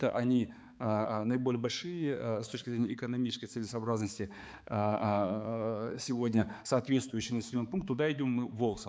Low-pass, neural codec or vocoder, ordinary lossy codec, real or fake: none; codec, 16 kHz, 4 kbps, X-Codec, HuBERT features, trained on balanced general audio; none; fake